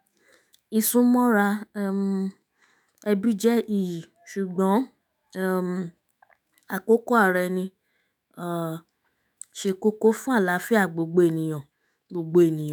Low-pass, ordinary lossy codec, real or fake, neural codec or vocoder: none; none; fake; autoencoder, 48 kHz, 128 numbers a frame, DAC-VAE, trained on Japanese speech